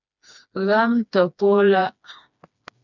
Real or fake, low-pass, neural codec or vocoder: fake; 7.2 kHz; codec, 16 kHz, 2 kbps, FreqCodec, smaller model